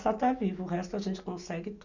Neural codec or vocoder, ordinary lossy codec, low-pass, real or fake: none; none; 7.2 kHz; real